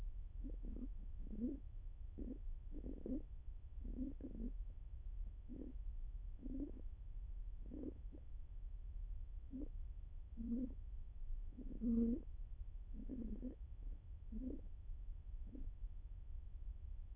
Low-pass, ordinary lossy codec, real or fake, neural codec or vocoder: 3.6 kHz; none; fake; autoencoder, 22.05 kHz, a latent of 192 numbers a frame, VITS, trained on many speakers